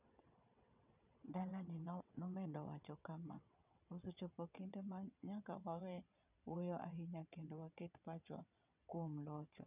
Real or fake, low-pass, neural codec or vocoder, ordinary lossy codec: fake; 3.6 kHz; vocoder, 22.05 kHz, 80 mel bands, WaveNeXt; none